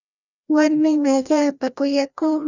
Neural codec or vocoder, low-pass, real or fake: codec, 16 kHz, 1 kbps, FreqCodec, larger model; 7.2 kHz; fake